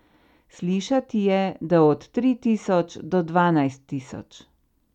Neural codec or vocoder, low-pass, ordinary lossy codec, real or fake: none; 19.8 kHz; none; real